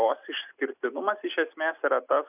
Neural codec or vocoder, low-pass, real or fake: none; 3.6 kHz; real